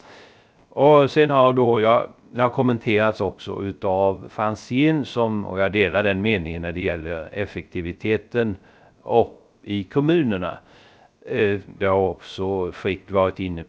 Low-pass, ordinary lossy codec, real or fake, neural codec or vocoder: none; none; fake; codec, 16 kHz, 0.3 kbps, FocalCodec